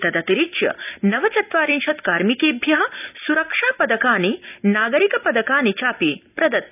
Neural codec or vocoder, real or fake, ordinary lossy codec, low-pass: none; real; none; 3.6 kHz